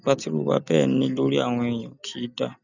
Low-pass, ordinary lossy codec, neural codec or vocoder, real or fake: 7.2 kHz; none; none; real